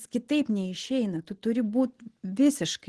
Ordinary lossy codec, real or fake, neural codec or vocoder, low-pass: Opus, 16 kbps; real; none; 10.8 kHz